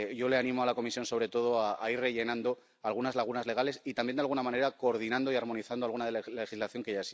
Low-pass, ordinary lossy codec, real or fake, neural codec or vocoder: none; none; real; none